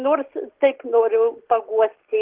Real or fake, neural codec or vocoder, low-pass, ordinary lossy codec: real; none; 3.6 kHz; Opus, 16 kbps